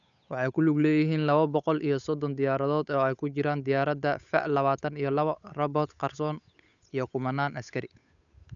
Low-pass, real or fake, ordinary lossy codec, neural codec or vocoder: 7.2 kHz; fake; none; codec, 16 kHz, 8 kbps, FunCodec, trained on Chinese and English, 25 frames a second